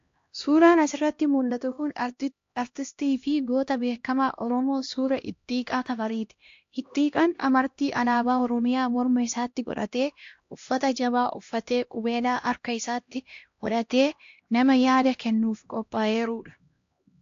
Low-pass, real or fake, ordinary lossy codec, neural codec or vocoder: 7.2 kHz; fake; AAC, 48 kbps; codec, 16 kHz, 1 kbps, X-Codec, HuBERT features, trained on LibriSpeech